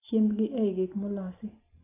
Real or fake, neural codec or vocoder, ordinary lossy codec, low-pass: real; none; AAC, 16 kbps; 3.6 kHz